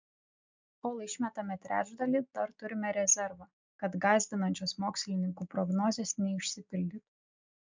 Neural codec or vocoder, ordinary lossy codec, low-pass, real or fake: none; MP3, 64 kbps; 7.2 kHz; real